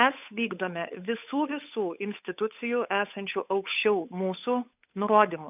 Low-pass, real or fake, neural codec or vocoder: 3.6 kHz; fake; codec, 16 kHz, 8 kbps, FunCodec, trained on Chinese and English, 25 frames a second